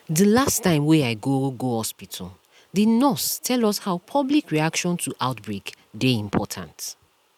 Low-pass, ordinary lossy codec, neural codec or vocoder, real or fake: 19.8 kHz; none; none; real